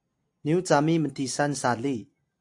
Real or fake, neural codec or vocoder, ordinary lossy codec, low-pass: real; none; AAC, 64 kbps; 10.8 kHz